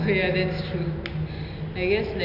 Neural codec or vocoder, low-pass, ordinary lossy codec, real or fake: none; 5.4 kHz; Opus, 64 kbps; real